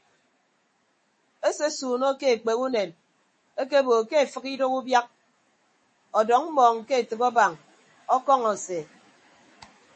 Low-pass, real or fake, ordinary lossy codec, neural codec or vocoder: 10.8 kHz; fake; MP3, 32 kbps; autoencoder, 48 kHz, 128 numbers a frame, DAC-VAE, trained on Japanese speech